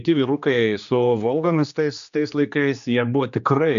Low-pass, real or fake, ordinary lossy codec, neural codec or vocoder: 7.2 kHz; fake; MP3, 96 kbps; codec, 16 kHz, 2 kbps, X-Codec, HuBERT features, trained on general audio